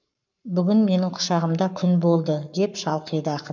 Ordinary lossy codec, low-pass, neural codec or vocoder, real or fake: none; 7.2 kHz; codec, 44.1 kHz, 7.8 kbps, Pupu-Codec; fake